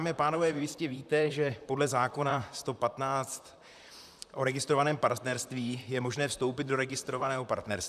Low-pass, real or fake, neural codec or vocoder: 14.4 kHz; fake; vocoder, 44.1 kHz, 128 mel bands, Pupu-Vocoder